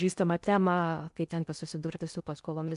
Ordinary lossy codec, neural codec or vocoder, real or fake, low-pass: MP3, 96 kbps; codec, 16 kHz in and 24 kHz out, 0.8 kbps, FocalCodec, streaming, 65536 codes; fake; 10.8 kHz